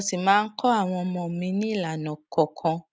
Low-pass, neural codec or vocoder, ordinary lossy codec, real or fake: none; none; none; real